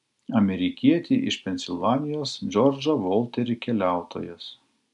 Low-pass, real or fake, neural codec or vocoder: 10.8 kHz; real; none